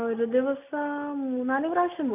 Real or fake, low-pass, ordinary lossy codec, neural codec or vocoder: real; 3.6 kHz; none; none